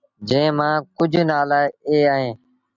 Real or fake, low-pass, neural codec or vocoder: real; 7.2 kHz; none